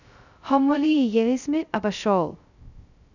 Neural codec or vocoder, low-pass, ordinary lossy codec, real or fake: codec, 16 kHz, 0.2 kbps, FocalCodec; 7.2 kHz; none; fake